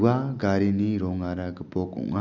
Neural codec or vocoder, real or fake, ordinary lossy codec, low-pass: none; real; none; 7.2 kHz